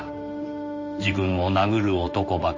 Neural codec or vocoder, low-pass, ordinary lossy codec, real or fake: codec, 16 kHz in and 24 kHz out, 1 kbps, XY-Tokenizer; 7.2 kHz; MP3, 32 kbps; fake